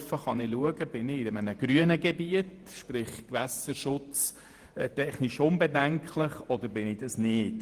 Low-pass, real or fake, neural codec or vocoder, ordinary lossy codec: 14.4 kHz; fake; vocoder, 48 kHz, 128 mel bands, Vocos; Opus, 24 kbps